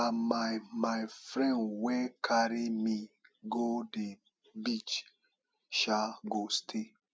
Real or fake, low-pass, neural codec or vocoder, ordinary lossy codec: real; none; none; none